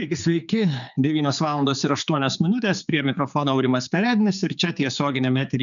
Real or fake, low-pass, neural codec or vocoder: fake; 7.2 kHz; codec, 16 kHz, 4 kbps, X-Codec, HuBERT features, trained on general audio